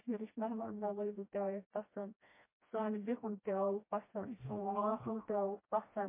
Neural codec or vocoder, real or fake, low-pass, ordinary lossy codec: codec, 16 kHz, 1 kbps, FreqCodec, smaller model; fake; 3.6 kHz; MP3, 24 kbps